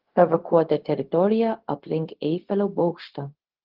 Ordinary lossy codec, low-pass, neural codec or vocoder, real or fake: Opus, 16 kbps; 5.4 kHz; codec, 16 kHz, 0.4 kbps, LongCat-Audio-Codec; fake